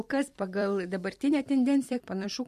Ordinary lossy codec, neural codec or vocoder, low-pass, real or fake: MP3, 64 kbps; vocoder, 44.1 kHz, 128 mel bands every 256 samples, BigVGAN v2; 14.4 kHz; fake